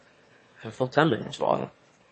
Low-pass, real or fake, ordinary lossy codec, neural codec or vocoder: 9.9 kHz; fake; MP3, 32 kbps; autoencoder, 22.05 kHz, a latent of 192 numbers a frame, VITS, trained on one speaker